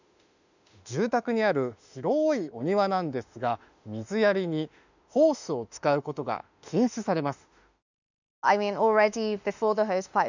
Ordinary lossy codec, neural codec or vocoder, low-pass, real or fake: none; autoencoder, 48 kHz, 32 numbers a frame, DAC-VAE, trained on Japanese speech; 7.2 kHz; fake